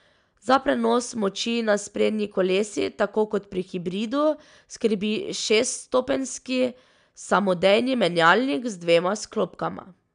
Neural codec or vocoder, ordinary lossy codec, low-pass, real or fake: none; none; 9.9 kHz; real